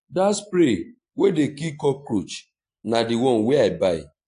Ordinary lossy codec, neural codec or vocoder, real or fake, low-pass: AAC, 48 kbps; none; real; 9.9 kHz